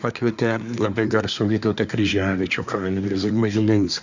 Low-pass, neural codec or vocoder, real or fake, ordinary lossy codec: 7.2 kHz; codec, 24 kHz, 1 kbps, SNAC; fake; Opus, 64 kbps